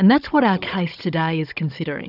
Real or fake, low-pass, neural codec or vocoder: fake; 5.4 kHz; codec, 16 kHz, 16 kbps, FunCodec, trained on Chinese and English, 50 frames a second